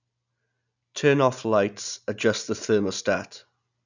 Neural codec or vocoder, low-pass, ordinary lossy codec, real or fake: none; 7.2 kHz; none; real